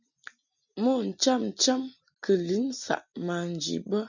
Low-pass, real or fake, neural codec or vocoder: 7.2 kHz; real; none